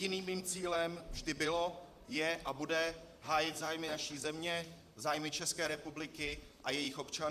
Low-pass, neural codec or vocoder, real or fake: 14.4 kHz; vocoder, 44.1 kHz, 128 mel bands, Pupu-Vocoder; fake